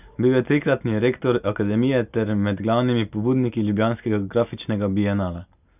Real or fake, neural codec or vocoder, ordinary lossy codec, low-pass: real; none; none; 3.6 kHz